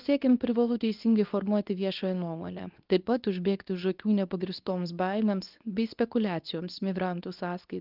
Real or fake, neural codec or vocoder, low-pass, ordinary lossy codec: fake; codec, 24 kHz, 0.9 kbps, WavTokenizer, medium speech release version 2; 5.4 kHz; Opus, 32 kbps